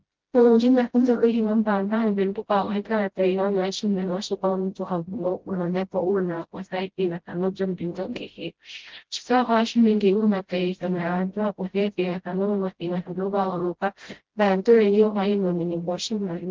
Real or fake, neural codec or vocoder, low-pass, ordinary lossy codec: fake; codec, 16 kHz, 0.5 kbps, FreqCodec, smaller model; 7.2 kHz; Opus, 16 kbps